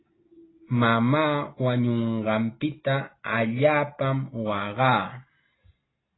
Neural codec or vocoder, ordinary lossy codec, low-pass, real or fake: none; AAC, 16 kbps; 7.2 kHz; real